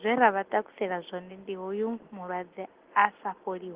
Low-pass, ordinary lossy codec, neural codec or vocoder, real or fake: 3.6 kHz; Opus, 16 kbps; none; real